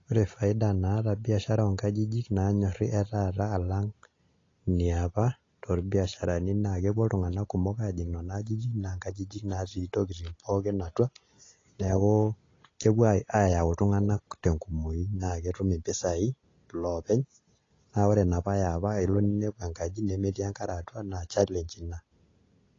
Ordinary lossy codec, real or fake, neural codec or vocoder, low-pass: AAC, 32 kbps; real; none; 7.2 kHz